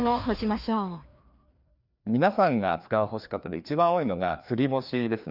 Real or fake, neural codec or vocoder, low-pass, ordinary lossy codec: fake; codec, 16 kHz, 2 kbps, FreqCodec, larger model; 5.4 kHz; none